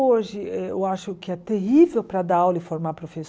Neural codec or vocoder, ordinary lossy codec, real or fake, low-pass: none; none; real; none